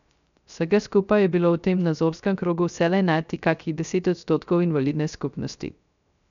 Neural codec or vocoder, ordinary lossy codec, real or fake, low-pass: codec, 16 kHz, 0.3 kbps, FocalCodec; none; fake; 7.2 kHz